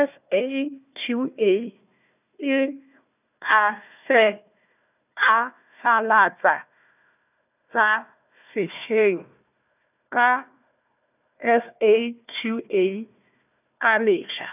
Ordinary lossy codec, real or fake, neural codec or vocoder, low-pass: none; fake; codec, 16 kHz, 1 kbps, FunCodec, trained on Chinese and English, 50 frames a second; 3.6 kHz